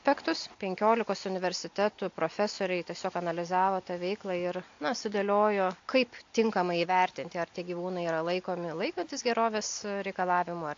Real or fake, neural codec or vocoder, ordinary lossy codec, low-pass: real; none; AAC, 48 kbps; 7.2 kHz